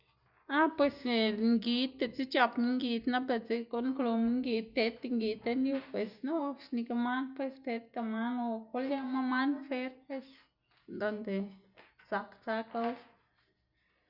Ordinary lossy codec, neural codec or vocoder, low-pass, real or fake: Opus, 64 kbps; none; 5.4 kHz; real